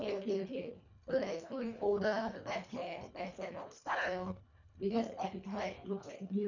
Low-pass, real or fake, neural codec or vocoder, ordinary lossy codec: 7.2 kHz; fake; codec, 24 kHz, 1.5 kbps, HILCodec; none